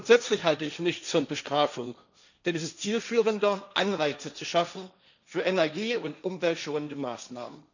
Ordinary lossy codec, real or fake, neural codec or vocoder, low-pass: none; fake; codec, 16 kHz, 1.1 kbps, Voila-Tokenizer; 7.2 kHz